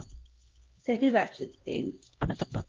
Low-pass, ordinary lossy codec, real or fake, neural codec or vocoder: 7.2 kHz; Opus, 32 kbps; fake; codec, 16 kHz, 1 kbps, X-Codec, HuBERT features, trained on LibriSpeech